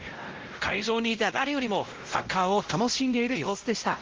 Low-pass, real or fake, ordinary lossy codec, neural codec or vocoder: 7.2 kHz; fake; Opus, 16 kbps; codec, 16 kHz, 0.5 kbps, X-Codec, WavLM features, trained on Multilingual LibriSpeech